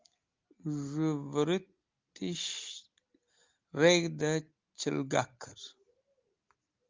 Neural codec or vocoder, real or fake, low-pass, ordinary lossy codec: none; real; 7.2 kHz; Opus, 32 kbps